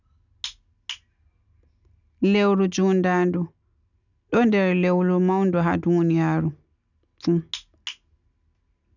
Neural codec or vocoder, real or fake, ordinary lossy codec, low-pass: none; real; none; 7.2 kHz